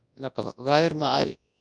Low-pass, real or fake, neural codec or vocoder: 9.9 kHz; fake; codec, 24 kHz, 0.9 kbps, WavTokenizer, large speech release